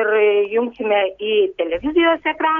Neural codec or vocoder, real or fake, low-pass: none; real; 7.2 kHz